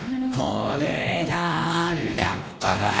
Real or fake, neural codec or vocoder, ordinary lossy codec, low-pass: fake; codec, 16 kHz, 1 kbps, X-Codec, WavLM features, trained on Multilingual LibriSpeech; none; none